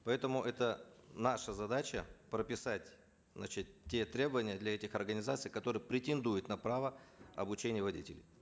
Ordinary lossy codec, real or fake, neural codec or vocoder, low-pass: none; real; none; none